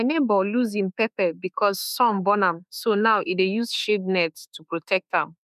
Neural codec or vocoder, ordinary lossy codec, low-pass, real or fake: autoencoder, 48 kHz, 32 numbers a frame, DAC-VAE, trained on Japanese speech; none; 14.4 kHz; fake